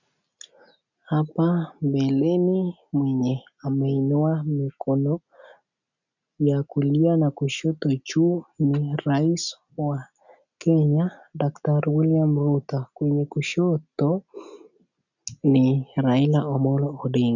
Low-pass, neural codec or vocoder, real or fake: 7.2 kHz; none; real